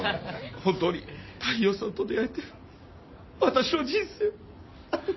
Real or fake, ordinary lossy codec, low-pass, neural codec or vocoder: real; MP3, 24 kbps; 7.2 kHz; none